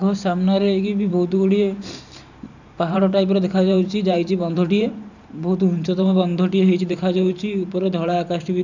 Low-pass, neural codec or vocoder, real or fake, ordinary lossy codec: 7.2 kHz; vocoder, 44.1 kHz, 128 mel bands every 512 samples, BigVGAN v2; fake; none